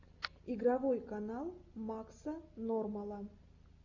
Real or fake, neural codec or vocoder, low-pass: real; none; 7.2 kHz